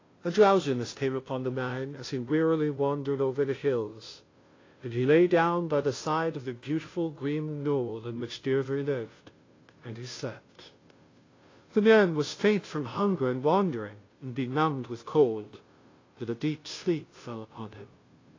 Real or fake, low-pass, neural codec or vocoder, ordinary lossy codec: fake; 7.2 kHz; codec, 16 kHz, 0.5 kbps, FunCodec, trained on Chinese and English, 25 frames a second; AAC, 32 kbps